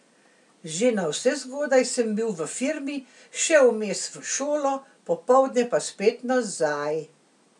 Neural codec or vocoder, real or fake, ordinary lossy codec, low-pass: none; real; none; 10.8 kHz